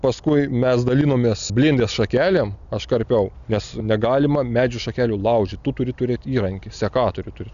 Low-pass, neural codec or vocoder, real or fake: 7.2 kHz; none; real